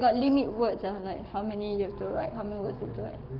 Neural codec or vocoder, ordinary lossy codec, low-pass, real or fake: codec, 44.1 kHz, 7.8 kbps, Pupu-Codec; Opus, 32 kbps; 5.4 kHz; fake